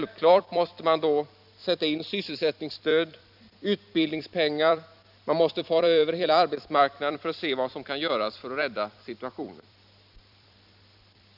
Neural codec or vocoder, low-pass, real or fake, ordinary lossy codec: vocoder, 44.1 kHz, 128 mel bands every 256 samples, BigVGAN v2; 5.4 kHz; fake; none